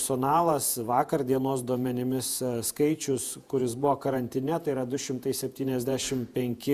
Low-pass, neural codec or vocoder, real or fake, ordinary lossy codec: 14.4 kHz; vocoder, 48 kHz, 128 mel bands, Vocos; fake; Opus, 64 kbps